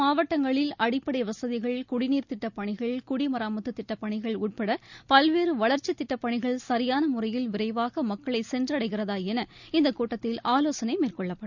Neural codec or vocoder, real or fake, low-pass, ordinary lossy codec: none; real; 7.2 kHz; none